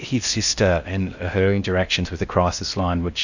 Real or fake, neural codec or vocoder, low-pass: fake; codec, 16 kHz in and 24 kHz out, 0.6 kbps, FocalCodec, streaming, 4096 codes; 7.2 kHz